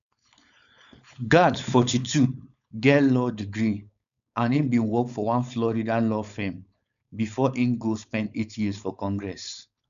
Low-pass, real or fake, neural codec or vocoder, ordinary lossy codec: 7.2 kHz; fake; codec, 16 kHz, 4.8 kbps, FACodec; none